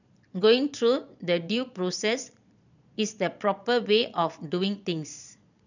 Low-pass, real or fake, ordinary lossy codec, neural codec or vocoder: 7.2 kHz; fake; none; vocoder, 22.05 kHz, 80 mel bands, Vocos